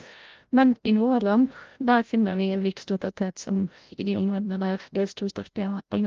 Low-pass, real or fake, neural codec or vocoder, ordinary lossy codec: 7.2 kHz; fake; codec, 16 kHz, 0.5 kbps, FreqCodec, larger model; Opus, 32 kbps